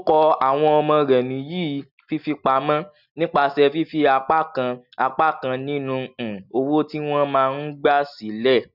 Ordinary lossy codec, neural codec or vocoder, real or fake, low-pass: none; none; real; 5.4 kHz